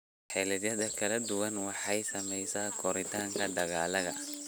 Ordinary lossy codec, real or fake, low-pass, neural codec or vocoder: none; real; none; none